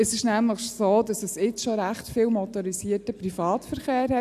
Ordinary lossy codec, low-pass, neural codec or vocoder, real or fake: none; 14.4 kHz; none; real